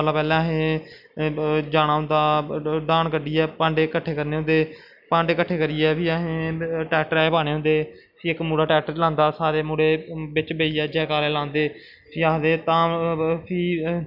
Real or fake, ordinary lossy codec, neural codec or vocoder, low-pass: real; none; none; 5.4 kHz